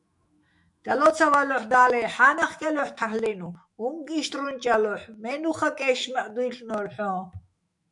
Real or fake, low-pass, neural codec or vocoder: fake; 10.8 kHz; autoencoder, 48 kHz, 128 numbers a frame, DAC-VAE, trained on Japanese speech